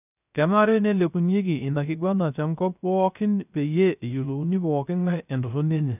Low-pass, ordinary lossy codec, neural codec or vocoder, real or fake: 3.6 kHz; none; codec, 16 kHz, 0.3 kbps, FocalCodec; fake